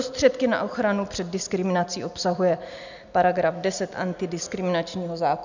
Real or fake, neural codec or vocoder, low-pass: real; none; 7.2 kHz